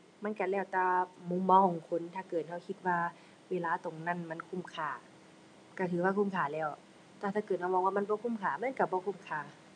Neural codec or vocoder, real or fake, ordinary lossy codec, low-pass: none; real; none; 9.9 kHz